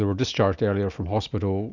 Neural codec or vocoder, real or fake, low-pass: none; real; 7.2 kHz